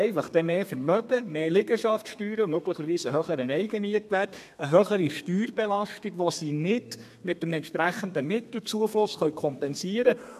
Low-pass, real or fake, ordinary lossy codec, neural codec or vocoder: 14.4 kHz; fake; MP3, 96 kbps; codec, 32 kHz, 1.9 kbps, SNAC